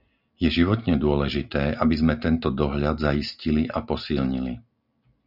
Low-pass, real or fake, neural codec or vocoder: 5.4 kHz; real; none